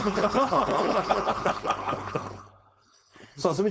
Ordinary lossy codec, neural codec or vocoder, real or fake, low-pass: none; codec, 16 kHz, 4.8 kbps, FACodec; fake; none